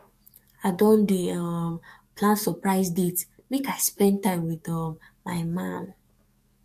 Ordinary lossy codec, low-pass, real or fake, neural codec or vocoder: MP3, 64 kbps; 14.4 kHz; fake; codec, 44.1 kHz, 7.8 kbps, DAC